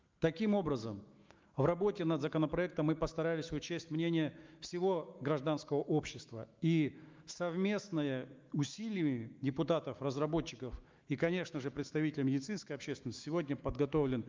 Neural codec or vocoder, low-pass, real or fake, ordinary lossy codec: autoencoder, 48 kHz, 128 numbers a frame, DAC-VAE, trained on Japanese speech; 7.2 kHz; fake; Opus, 32 kbps